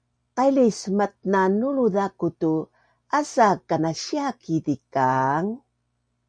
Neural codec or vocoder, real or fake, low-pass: none; real; 9.9 kHz